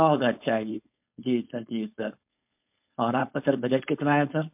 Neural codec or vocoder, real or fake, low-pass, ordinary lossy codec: codec, 16 kHz, 4.8 kbps, FACodec; fake; 3.6 kHz; MP3, 32 kbps